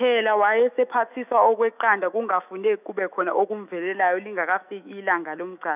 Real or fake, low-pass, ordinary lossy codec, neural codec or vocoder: fake; 3.6 kHz; none; autoencoder, 48 kHz, 128 numbers a frame, DAC-VAE, trained on Japanese speech